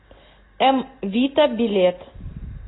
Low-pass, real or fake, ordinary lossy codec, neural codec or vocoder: 7.2 kHz; real; AAC, 16 kbps; none